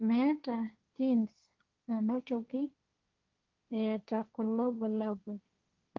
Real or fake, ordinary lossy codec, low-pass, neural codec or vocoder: fake; Opus, 24 kbps; 7.2 kHz; codec, 16 kHz, 1.1 kbps, Voila-Tokenizer